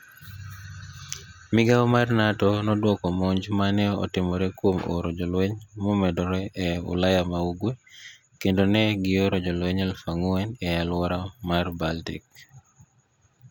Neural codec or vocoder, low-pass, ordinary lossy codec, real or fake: none; 19.8 kHz; none; real